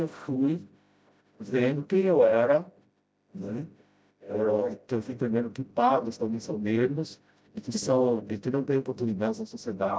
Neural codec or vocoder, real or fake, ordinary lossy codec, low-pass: codec, 16 kHz, 0.5 kbps, FreqCodec, smaller model; fake; none; none